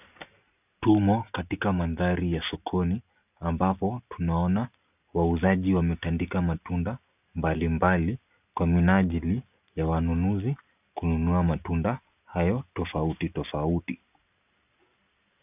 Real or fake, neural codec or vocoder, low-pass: real; none; 3.6 kHz